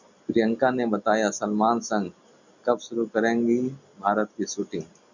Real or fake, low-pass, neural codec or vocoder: real; 7.2 kHz; none